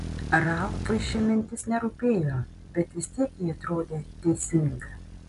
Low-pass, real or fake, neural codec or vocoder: 10.8 kHz; real; none